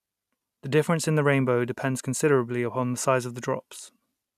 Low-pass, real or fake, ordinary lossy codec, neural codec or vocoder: 14.4 kHz; real; none; none